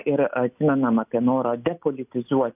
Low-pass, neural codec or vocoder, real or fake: 3.6 kHz; none; real